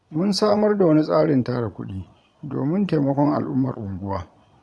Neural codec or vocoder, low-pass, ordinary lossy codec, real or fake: vocoder, 22.05 kHz, 80 mel bands, Vocos; none; none; fake